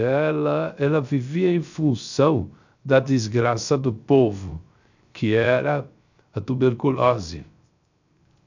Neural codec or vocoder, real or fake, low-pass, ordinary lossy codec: codec, 16 kHz, 0.3 kbps, FocalCodec; fake; 7.2 kHz; none